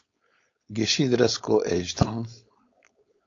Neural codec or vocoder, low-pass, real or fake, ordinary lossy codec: codec, 16 kHz, 4.8 kbps, FACodec; 7.2 kHz; fake; AAC, 48 kbps